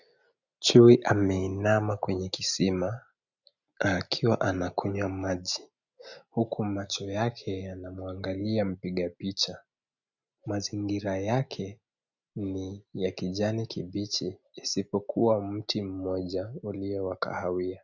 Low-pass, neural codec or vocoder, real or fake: 7.2 kHz; none; real